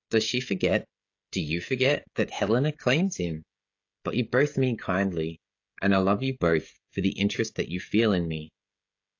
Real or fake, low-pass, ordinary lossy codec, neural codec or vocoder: fake; 7.2 kHz; AAC, 48 kbps; codec, 16 kHz, 16 kbps, FreqCodec, smaller model